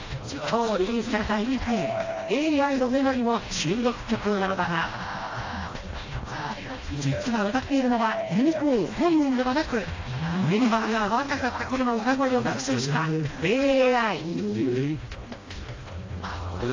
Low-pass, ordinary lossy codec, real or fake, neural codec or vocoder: 7.2 kHz; AAC, 32 kbps; fake; codec, 16 kHz, 1 kbps, FreqCodec, smaller model